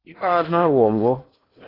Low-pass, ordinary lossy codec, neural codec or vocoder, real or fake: 5.4 kHz; AAC, 24 kbps; codec, 16 kHz in and 24 kHz out, 0.8 kbps, FocalCodec, streaming, 65536 codes; fake